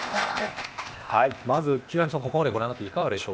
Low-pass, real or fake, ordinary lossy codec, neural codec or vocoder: none; fake; none; codec, 16 kHz, 0.8 kbps, ZipCodec